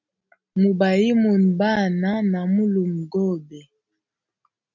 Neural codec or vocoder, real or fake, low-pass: none; real; 7.2 kHz